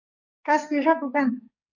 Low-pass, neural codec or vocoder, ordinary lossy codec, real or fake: 7.2 kHz; codec, 44.1 kHz, 2.6 kbps, SNAC; MP3, 48 kbps; fake